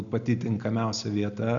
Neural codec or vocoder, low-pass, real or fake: none; 7.2 kHz; real